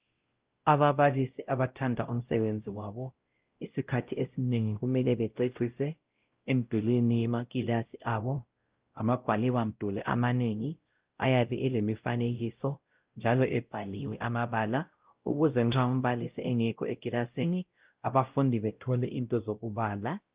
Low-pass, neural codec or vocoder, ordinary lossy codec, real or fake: 3.6 kHz; codec, 16 kHz, 0.5 kbps, X-Codec, WavLM features, trained on Multilingual LibriSpeech; Opus, 32 kbps; fake